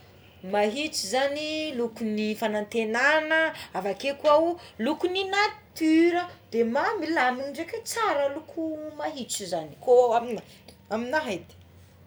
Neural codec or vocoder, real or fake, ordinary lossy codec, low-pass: none; real; none; none